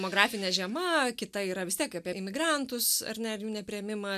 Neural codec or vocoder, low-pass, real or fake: none; 14.4 kHz; real